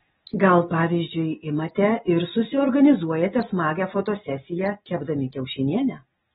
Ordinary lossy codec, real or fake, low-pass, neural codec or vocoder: AAC, 16 kbps; real; 7.2 kHz; none